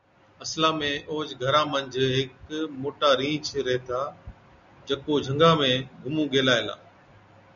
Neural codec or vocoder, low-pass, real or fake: none; 7.2 kHz; real